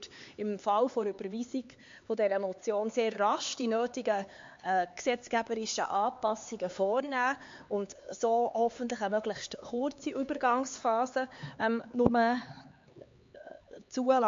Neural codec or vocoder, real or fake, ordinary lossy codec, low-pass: codec, 16 kHz, 4 kbps, X-Codec, HuBERT features, trained on LibriSpeech; fake; MP3, 48 kbps; 7.2 kHz